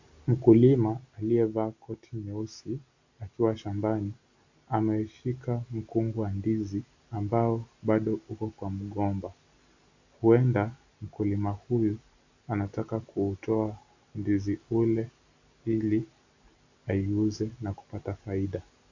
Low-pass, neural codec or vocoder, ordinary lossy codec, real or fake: 7.2 kHz; none; AAC, 48 kbps; real